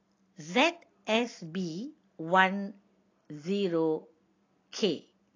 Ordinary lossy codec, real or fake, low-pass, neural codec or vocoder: AAC, 32 kbps; real; 7.2 kHz; none